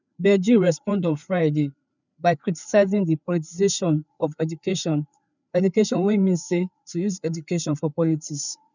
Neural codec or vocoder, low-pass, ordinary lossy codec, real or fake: codec, 16 kHz, 4 kbps, FreqCodec, larger model; 7.2 kHz; none; fake